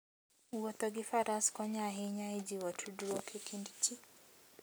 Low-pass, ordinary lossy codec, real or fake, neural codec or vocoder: none; none; real; none